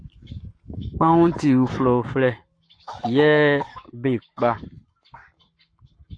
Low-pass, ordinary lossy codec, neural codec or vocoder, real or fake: 9.9 kHz; AAC, 48 kbps; codec, 44.1 kHz, 7.8 kbps, Pupu-Codec; fake